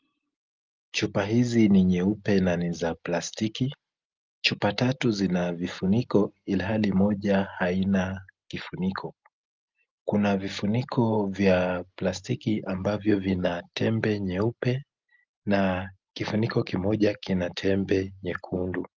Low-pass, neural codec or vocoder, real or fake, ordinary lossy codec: 7.2 kHz; none; real; Opus, 32 kbps